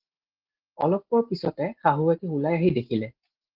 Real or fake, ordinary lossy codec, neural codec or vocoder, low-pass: real; Opus, 16 kbps; none; 5.4 kHz